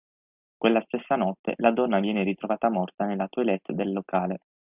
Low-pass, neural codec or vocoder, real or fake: 3.6 kHz; none; real